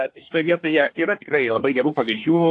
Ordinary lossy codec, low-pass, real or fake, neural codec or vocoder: AAC, 48 kbps; 10.8 kHz; fake; codec, 24 kHz, 1 kbps, SNAC